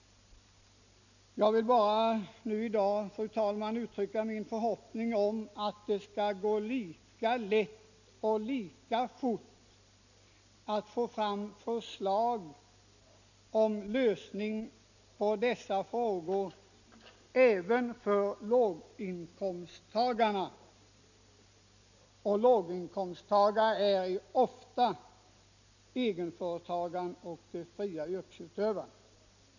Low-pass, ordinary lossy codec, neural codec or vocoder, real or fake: 7.2 kHz; none; none; real